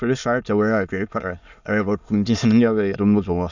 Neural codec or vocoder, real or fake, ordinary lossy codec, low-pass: autoencoder, 22.05 kHz, a latent of 192 numbers a frame, VITS, trained on many speakers; fake; none; 7.2 kHz